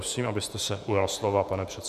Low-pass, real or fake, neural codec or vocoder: 14.4 kHz; fake; vocoder, 48 kHz, 128 mel bands, Vocos